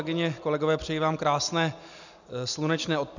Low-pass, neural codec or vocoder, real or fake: 7.2 kHz; none; real